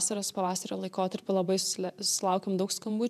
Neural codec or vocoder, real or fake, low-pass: vocoder, 48 kHz, 128 mel bands, Vocos; fake; 14.4 kHz